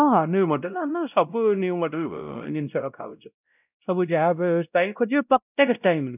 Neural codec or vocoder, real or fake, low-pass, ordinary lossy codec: codec, 16 kHz, 0.5 kbps, X-Codec, WavLM features, trained on Multilingual LibriSpeech; fake; 3.6 kHz; none